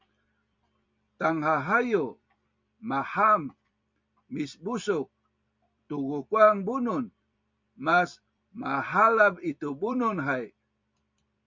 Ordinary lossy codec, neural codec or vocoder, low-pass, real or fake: MP3, 64 kbps; vocoder, 44.1 kHz, 128 mel bands every 256 samples, BigVGAN v2; 7.2 kHz; fake